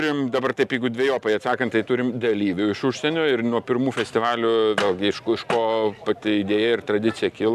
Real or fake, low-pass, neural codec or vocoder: real; 14.4 kHz; none